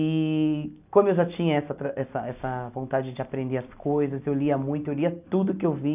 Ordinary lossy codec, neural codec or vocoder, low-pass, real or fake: none; none; 3.6 kHz; real